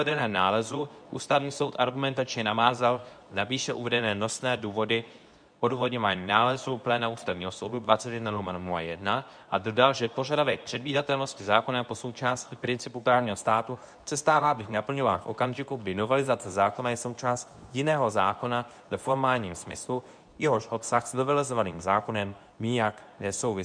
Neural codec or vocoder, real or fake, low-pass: codec, 24 kHz, 0.9 kbps, WavTokenizer, medium speech release version 2; fake; 9.9 kHz